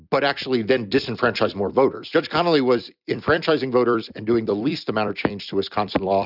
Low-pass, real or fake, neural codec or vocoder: 5.4 kHz; fake; vocoder, 44.1 kHz, 128 mel bands every 256 samples, BigVGAN v2